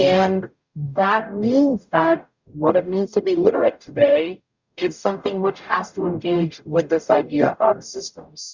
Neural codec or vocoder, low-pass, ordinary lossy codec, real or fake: codec, 44.1 kHz, 0.9 kbps, DAC; 7.2 kHz; Opus, 64 kbps; fake